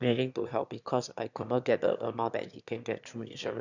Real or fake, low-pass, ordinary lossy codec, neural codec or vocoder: fake; 7.2 kHz; none; autoencoder, 22.05 kHz, a latent of 192 numbers a frame, VITS, trained on one speaker